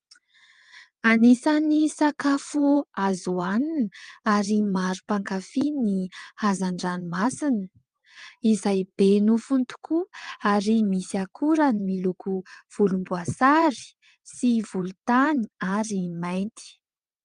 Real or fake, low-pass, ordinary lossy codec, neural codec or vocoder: fake; 9.9 kHz; Opus, 32 kbps; vocoder, 22.05 kHz, 80 mel bands, WaveNeXt